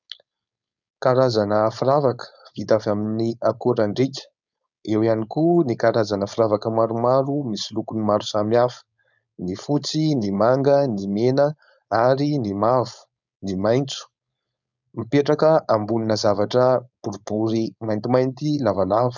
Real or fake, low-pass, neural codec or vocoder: fake; 7.2 kHz; codec, 16 kHz, 4.8 kbps, FACodec